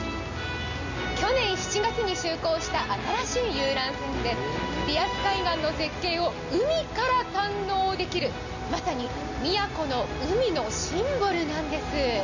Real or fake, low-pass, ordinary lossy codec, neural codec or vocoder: real; 7.2 kHz; none; none